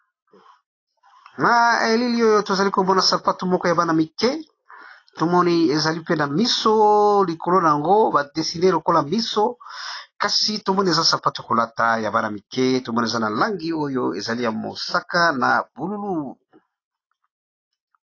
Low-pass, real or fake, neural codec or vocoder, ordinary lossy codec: 7.2 kHz; real; none; AAC, 32 kbps